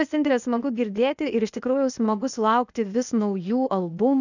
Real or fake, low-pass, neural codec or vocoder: fake; 7.2 kHz; codec, 16 kHz, 0.8 kbps, ZipCodec